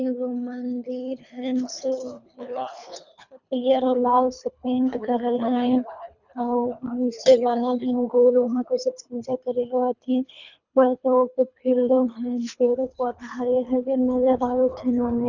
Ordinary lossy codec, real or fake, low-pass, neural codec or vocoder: none; fake; 7.2 kHz; codec, 24 kHz, 3 kbps, HILCodec